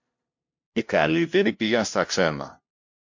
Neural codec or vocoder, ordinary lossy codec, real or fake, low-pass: codec, 16 kHz, 0.5 kbps, FunCodec, trained on LibriTTS, 25 frames a second; MP3, 48 kbps; fake; 7.2 kHz